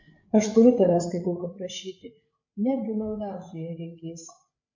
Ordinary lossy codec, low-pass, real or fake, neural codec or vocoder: MP3, 48 kbps; 7.2 kHz; fake; codec, 16 kHz, 8 kbps, FreqCodec, larger model